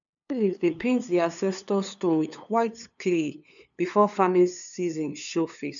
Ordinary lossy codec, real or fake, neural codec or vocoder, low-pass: none; fake; codec, 16 kHz, 2 kbps, FunCodec, trained on LibriTTS, 25 frames a second; 7.2 kHz